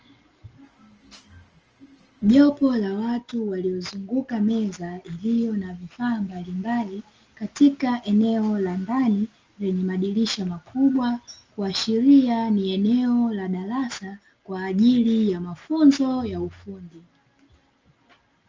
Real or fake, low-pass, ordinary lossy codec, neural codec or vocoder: real; 7.2 kHz; Opus, 24 kbps; none